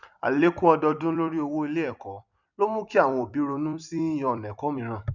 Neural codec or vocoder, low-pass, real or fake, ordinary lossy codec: vocoder, 44.1 kHz, 128 mel bands every 256 samples, BigVGAN v2; 7.2 kHz; fake; none